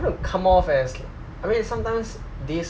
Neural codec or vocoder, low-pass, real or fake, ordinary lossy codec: none; none; real; none